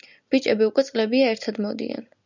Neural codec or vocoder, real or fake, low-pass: none; real; 7.2 kHz